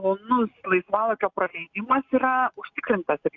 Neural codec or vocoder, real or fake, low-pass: none; real; 7.2 kHz